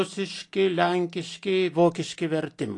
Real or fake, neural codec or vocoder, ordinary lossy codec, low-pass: real; none; AAC, 48 kbps; 10.8 kHz